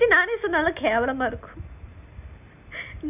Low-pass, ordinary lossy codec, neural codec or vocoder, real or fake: 3.6 kHz; none; none; real